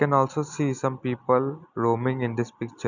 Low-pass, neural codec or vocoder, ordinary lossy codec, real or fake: 7.2 kHz; none; none; real